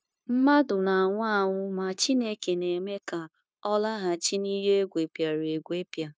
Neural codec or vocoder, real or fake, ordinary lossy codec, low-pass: codec, 16 kHz, 0.9 kbps, LongCat-Audio-Codec; fake; none; none